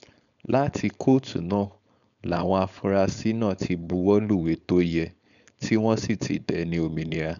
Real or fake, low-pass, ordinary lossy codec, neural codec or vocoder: fake; 7.2 kHz; none; codec, 16 kHz, 4.8 kbps, FACodec